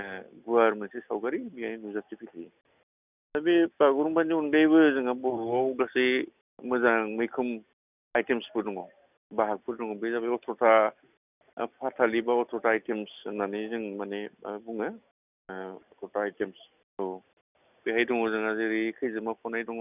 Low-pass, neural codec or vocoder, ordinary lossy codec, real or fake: 3.6 kHz; none; none; real